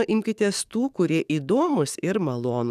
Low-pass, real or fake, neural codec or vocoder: 14.4 kHz; fake; codec, 44.1 kHz, 7.8 kbps, DAC